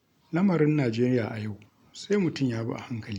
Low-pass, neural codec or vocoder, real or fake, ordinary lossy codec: 19.8 kHz; none; real; MP3, 96 kbps